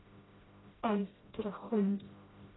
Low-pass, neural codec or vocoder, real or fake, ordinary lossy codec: 7.2 kHz; codec, 16 kHz, 0.5 kbps, FreqCodec, smaller model; fake; AAC, 16 kbps